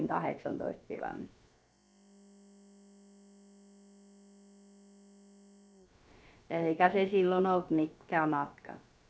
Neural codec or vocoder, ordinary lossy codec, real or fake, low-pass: codec, 16 kHz, about 1 kbps, DyCAST, with the encoder's durations; none; fake; none